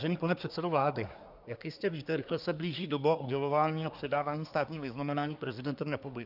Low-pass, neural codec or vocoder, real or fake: 5.4 kHz; codec, 24 kHz, 1 kbps, SNAC; fake